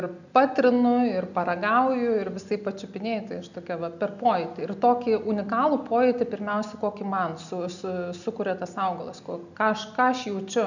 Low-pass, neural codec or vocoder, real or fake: 7.2 kHz; none; real